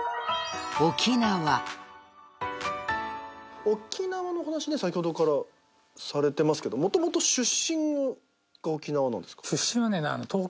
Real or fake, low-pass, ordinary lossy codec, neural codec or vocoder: real; none; none; none